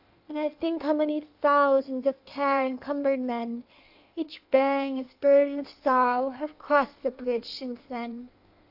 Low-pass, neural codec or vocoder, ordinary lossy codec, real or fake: 5.4 kHz; codec, 16 kHz in and 24 kHz out, 1.1 kbps, FireRedTTS-2 codec; AAC, 48 kbps; fake